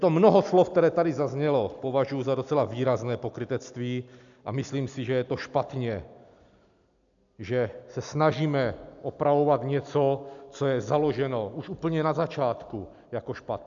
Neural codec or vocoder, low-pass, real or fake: none; 7.2 kHz; real